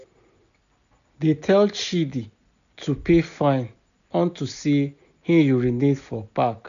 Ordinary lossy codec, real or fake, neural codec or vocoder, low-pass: none; real; none; 7.2 kHz